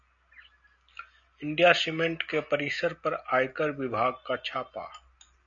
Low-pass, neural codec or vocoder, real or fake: 7.2 kHz; none; real